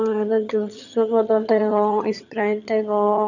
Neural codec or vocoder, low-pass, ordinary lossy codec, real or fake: vocoder, 22.05 kHz, 80 mel bands, HiFi-GAN; 7.2 kHz; none; fake